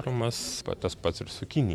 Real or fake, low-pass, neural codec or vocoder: fake; 19.8 kHz; vocoder, 44.1 kHz, 128 mel bands every 512 samples, BigVGAN v2